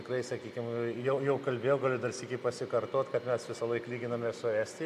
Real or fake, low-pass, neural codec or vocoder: real; 14.4 kHz; none